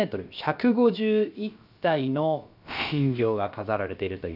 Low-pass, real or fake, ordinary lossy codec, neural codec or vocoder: 5.4 kHz; fake; none; codec, 16 kHz, about 1 kbps, DyCAST, with the encoder's durations